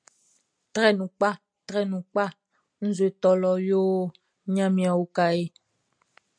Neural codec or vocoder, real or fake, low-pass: none; real; 9.9 kHz